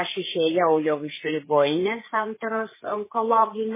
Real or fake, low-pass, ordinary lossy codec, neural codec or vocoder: fake; 3.6 kHz; MP3, 16 kbps; codec, 16 kHz, 4 kbps, FreqCodec, larger model